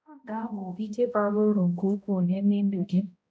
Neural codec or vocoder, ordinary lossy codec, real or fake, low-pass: codec, 16 kHz, 0.5 kbps, X-Codec, HuBERT features, trained on balanced general audio; none; fake; none